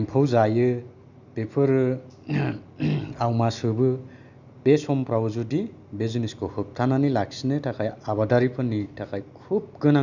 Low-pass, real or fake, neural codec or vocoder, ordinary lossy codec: 7.2 kHz; real; none; none